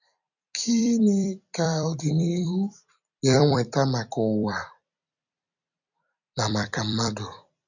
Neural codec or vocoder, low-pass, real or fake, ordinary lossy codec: vocoder, 44.1 kHz, 128 mel bands every 256 samples, BigVGAN v2; 7.2 kHz; fake; none